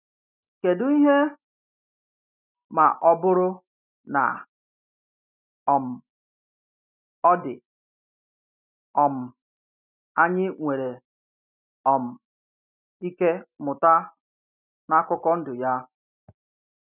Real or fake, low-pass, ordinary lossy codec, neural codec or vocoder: real; 3.6 kHz; none; none